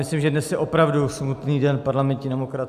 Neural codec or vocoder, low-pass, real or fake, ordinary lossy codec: none; 14.4 kHz; real; AAC, 96 kbps